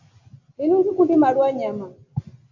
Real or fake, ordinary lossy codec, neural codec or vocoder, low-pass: real; AAC, 48 kbps; none; 7.2 kHz